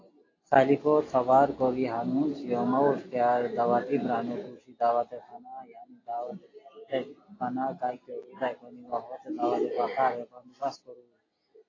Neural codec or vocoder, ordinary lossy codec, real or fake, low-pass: none; AAC, 32 kbps; real; 7.2 kHz